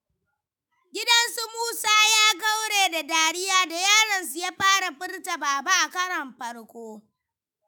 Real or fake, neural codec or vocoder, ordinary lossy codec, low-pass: fake; autoencoder, 48 kHz, 128 numbers a frame, DAC-VAE, trained on Japanese speech; none; none